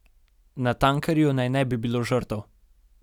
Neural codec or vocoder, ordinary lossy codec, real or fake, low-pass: none; none; real; 19.8 kHz